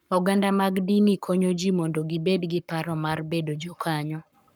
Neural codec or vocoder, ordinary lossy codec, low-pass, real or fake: codec, 44.1 kHz, 7.8 kbps, Pupu-Codec; none; none; fake